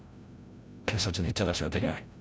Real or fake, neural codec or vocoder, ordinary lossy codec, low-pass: fake; codec, 16 kHz, 0.5 kbps, FreqCodec, larger model; none; none